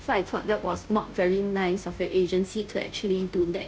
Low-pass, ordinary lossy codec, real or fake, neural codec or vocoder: none; none; fake; codec, 16 kHz, 0.5 kbps, FunCodec, trained on Chinese and English, 25 frames a second